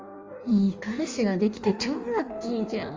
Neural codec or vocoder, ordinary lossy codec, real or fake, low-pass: codec, 16 kHz in and 24 kHz out, 1.1 kbps, FireRedTTS-2 codec; Opus, 32 kbps; fake; 7.2 kHz